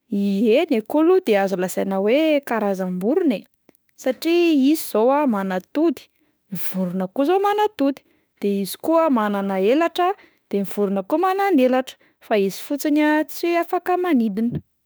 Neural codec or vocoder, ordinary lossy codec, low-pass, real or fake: autoencoder, 48 kHz, 32 numbers a frame, DAC-VAE, trained on Japanese speech; none; none; fake